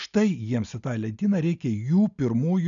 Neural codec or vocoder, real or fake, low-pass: none; real; 7.2 kHz